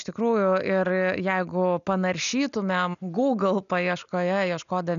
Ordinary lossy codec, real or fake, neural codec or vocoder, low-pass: MP3, 96 kbps; real; none; 7.2 kHz